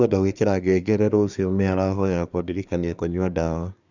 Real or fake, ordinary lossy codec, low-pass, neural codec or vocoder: fake; none; 7.2 kHz; codec, 24 kHz, 1 kbps, SNAC